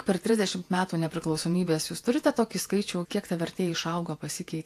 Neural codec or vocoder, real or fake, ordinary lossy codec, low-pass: vocoder, 48 kHz, 128 mel bands, Vocos; fake; AAC, 64 kbps; 14.4 kHz